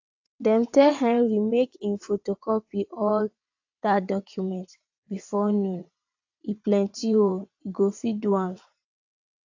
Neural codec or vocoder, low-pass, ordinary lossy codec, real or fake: vocoder, 22.05 kHz, 80 mel bands, WaveNeXt; 7.2 kHz; AAC, 48 kbps; fake